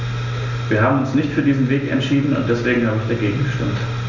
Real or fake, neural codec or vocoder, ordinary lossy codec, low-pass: real; none; none; 7.2 kHz